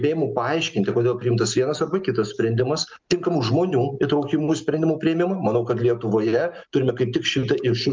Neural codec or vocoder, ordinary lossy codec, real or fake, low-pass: none; Opus, 24 kbps; real; 7.2 kHz